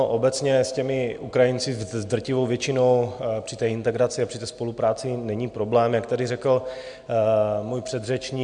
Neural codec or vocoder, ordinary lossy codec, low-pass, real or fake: none; MP3, 64 kbps; 9.9 kHz; real